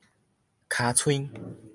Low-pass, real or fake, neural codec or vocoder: 10.8 kHz; real; none